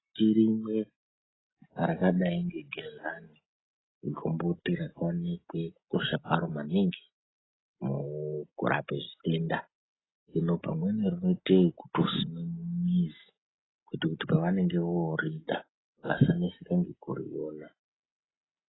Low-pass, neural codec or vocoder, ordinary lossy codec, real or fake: 7.2 kHz; none; AAC, 16 kbps; real